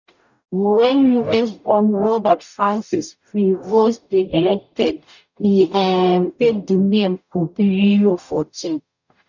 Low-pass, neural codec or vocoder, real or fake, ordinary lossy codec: 7.2 kHz; codec, 44.1 kHz, 0.9 kbps, DAC; fake; none